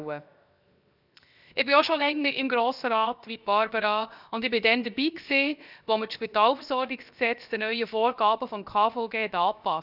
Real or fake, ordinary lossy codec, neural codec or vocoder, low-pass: fake; none; codec, 16 kHz, 0.7 kbps, FocalCodec; 5.4 kHz